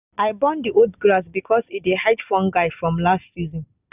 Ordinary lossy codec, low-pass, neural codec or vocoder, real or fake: none; 3.6 kHz; none; real